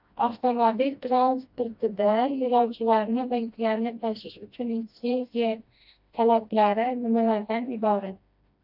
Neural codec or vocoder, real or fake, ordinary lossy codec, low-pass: codec, 16 kHz, 1 kbps, FreqCodec, smaller model; fake; AAC, 48 kbps; 5.4 kHz